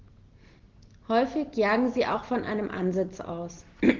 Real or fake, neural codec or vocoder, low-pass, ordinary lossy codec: real; none; 7.2 kHz; Opus, 16 kbps